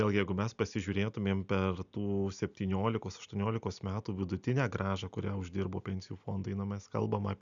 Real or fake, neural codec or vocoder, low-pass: real; none; 7.2 kHz